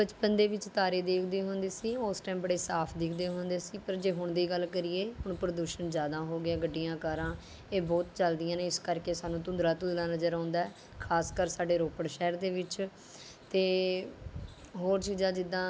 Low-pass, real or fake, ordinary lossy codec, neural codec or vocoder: none; real; none; none